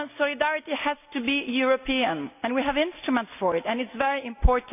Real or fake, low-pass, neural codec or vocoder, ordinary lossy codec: real; 3.6 kHz; none; none